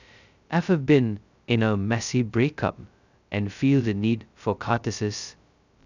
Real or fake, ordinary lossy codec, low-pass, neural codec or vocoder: fake; none; 7.2 kHz; codec, 16 kHz, 0.2 kbps, FocalCodec